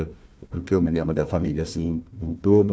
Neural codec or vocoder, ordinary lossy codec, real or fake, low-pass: codec, 16 kHz, 1 kbps, FunCodec, trained on Chinese and English, 50 frames a second; none; fake; none